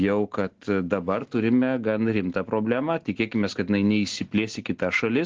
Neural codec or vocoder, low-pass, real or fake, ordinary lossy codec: none; 7.2 kHz; real; Opus, 16 kbps